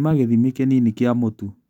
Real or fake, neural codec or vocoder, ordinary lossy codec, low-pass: real; none; none; 19.8 kHz